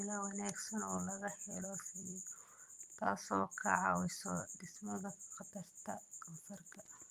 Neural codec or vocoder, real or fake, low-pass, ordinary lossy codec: vocoder, 44.1 kHz, 128 mel bands every 256 samples, BigVGAN v2; fake; 14.4 kHz; Opus, 24 kbps